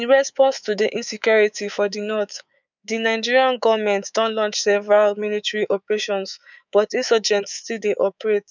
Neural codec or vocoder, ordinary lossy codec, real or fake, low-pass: codec, 24 kHz, 3.1 kbps, DualCodec; none; fake; 7.2 kHz